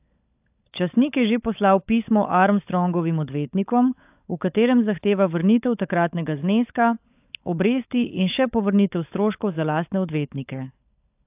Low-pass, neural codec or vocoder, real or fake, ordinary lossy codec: 3.6 kHz; codec, 16 kHz, 16 kbps, FunCodec, trained on LibriTTS, 50 frames a second; fake; AAC, 32 kbps